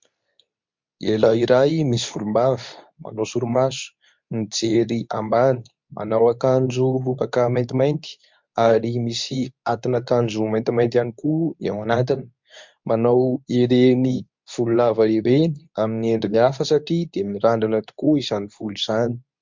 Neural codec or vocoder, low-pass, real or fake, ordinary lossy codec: codec, 24 kHz, 0.9 kbps, WavTokenizer, medium speech release version 2; 7.2 kHz; fake; MP3, 64 kbps